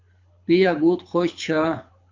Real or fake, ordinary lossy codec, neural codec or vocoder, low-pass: fake; MP3, 48 kbps; codec, 24 kHz, 6 kbps, HILCodec; 7.2 kHz